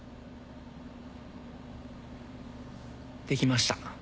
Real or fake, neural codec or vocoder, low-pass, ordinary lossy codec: real; none; none; none